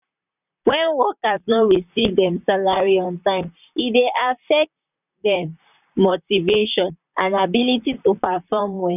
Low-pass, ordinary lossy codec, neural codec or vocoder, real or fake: 3.6 kHz; none; vocoder, 44.1 kHz, 128 mel bands, Pupu-Vocoder; fake